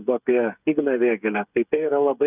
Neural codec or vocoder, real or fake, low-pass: codec, 16 kHz, 8 kbps, FreqCodec, smaller model; fake; 3.6 kHz